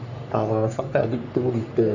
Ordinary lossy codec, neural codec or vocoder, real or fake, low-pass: none; codec, 44.1 kHz, 3.4 kbps, Pupu-Codec; fake; 7.2 kHz